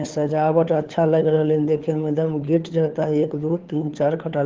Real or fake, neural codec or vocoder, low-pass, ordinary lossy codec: fake; codec, 16 kHz, 4 kbps, FunCodec, trained on LibriTTS, 50 frames a second; 7.2 kHz; Opus, 16 kbps